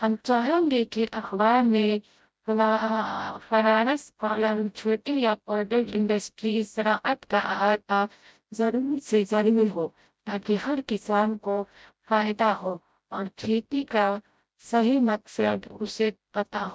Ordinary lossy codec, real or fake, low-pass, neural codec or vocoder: none; fake; none; codec, 16 kHz, 0.5 kbps, FreqCodec, smaller model